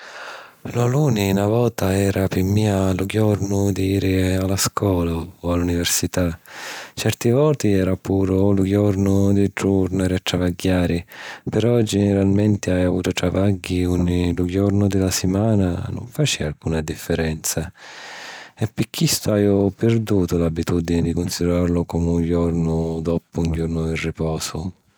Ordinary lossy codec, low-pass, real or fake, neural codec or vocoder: none; none; real; none